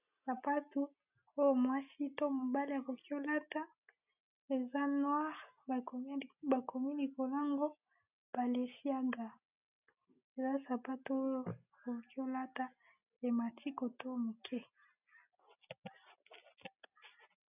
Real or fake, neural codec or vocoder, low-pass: real; none; 3.6 kHz